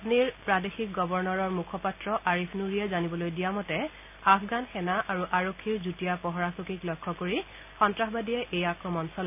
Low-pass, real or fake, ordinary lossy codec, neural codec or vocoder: 3.6 kHz; real; none; none